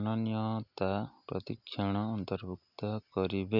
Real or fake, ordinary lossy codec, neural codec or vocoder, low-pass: real; none; none; 5.4 kHz